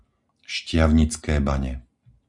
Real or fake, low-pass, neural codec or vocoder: real; 10.8 kHz; none